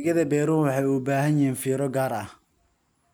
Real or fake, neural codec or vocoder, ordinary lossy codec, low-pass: real; none; none; none